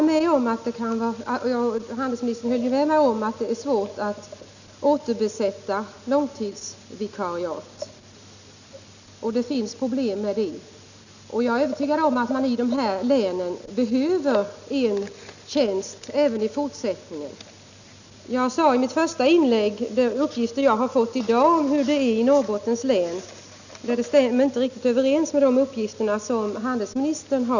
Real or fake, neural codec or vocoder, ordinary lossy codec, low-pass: real; none; none; 7.2 kHz